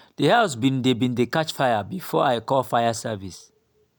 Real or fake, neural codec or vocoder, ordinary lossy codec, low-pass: real; none; none; none